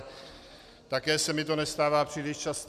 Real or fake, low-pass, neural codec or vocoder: real; 14.4 kHz; none